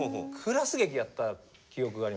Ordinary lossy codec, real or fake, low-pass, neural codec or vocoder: none; real; none; none